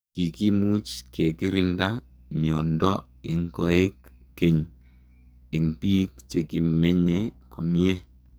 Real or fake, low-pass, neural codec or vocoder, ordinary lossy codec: fake; none; codec, 44.1 kHz, 2.6 kbps, SNAC; none